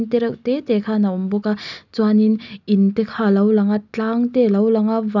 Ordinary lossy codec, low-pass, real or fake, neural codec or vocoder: none; 7.2 kHz; real; none